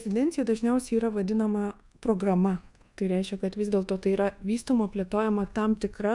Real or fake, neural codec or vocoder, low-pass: fake; codec, 24 kHz, 1.2 kbps, DualCodec; 10.8 kHz